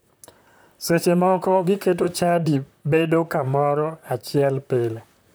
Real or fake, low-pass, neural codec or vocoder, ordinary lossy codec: fake; none; vocoder, 44.1 kHz, 128 mel bands, Pupu-Vocoder; none